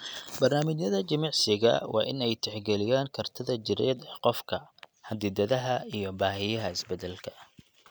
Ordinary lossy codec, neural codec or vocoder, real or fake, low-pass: none; none; real; none